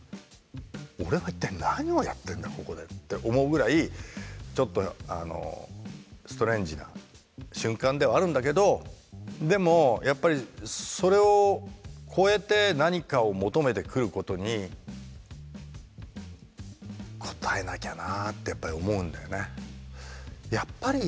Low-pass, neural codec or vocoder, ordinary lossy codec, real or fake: none; none; none; real